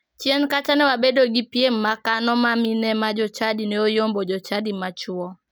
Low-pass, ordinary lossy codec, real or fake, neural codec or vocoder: none; none; real; none